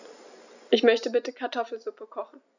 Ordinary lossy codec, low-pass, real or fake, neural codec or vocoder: none; 7.2 kHz; real; none